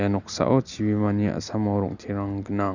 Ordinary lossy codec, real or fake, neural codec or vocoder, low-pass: none; real; none; 7.2 kHz